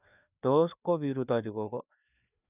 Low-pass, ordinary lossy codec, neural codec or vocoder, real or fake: 3.6 kHz; none; codec, 16 kHz, 2 kbps, FreqCodec, larger model; fake